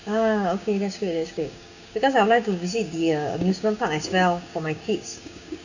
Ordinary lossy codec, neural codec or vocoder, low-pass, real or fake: none; codec, 16 kHz, 6 kbps, DAC; 7.2 kHz; fake